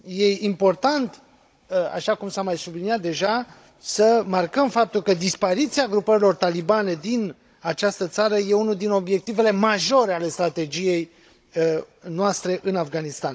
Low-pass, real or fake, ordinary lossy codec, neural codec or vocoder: none; fake; none; codec, 16 kHz, 16 kbps, FunCodec, trained on Chinese and English, 50 frames a second